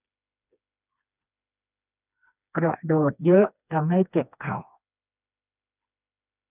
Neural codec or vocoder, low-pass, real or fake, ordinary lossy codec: codec, 16 kHz, 2 kbps, FreqCodec, smaller model; 3.6 kHz; fake; none